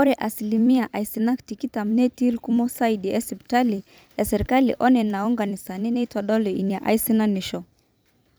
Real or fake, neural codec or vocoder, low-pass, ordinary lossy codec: fake; vocoder, 44.1 kHz, 128 mel bands every 256 samples, BigVGAN v2; none; none